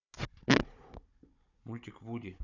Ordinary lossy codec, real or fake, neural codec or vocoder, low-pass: none; fake; codec, 16 kHz, 16 kbps, FunCodec, trained on Chinese and English, 50 frames a second; 7.2 kHz